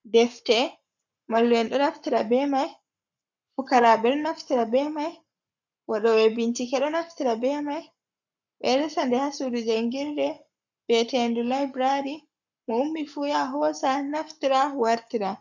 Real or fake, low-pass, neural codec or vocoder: fake; 7.2 kHz; codec, 44.1 kHz, 7.8 kbps, Pupu-Codec